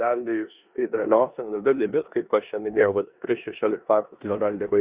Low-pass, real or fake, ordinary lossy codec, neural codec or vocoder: 3.6 kHz; fake; Opus, 64 kbps; codec, 16 kHz in and 24 kHz out, 0.9 kbps, LongCat-Audio-Codec, four codebook decoder